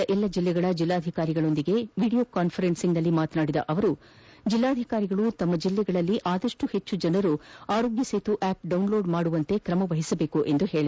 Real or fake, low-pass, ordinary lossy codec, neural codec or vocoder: real; none; none; none